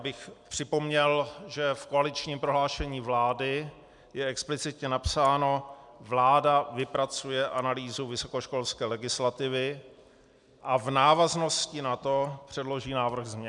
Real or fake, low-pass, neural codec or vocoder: real; 10.8 kHz; none